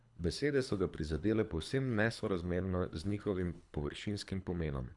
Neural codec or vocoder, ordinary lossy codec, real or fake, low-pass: codec, 24 kHz, 3 kbps, HILCodec; none; fake; 10.8 kHz